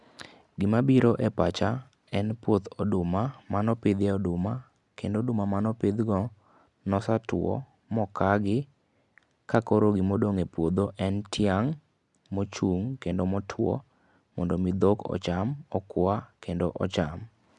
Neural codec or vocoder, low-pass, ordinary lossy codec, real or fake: none; 10.8 kHz; none; real